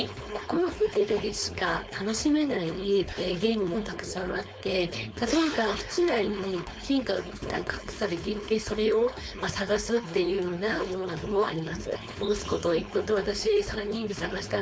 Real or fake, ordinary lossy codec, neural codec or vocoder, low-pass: fake; none; codec, 16 kHz, 4.8 kbps, FACodec; none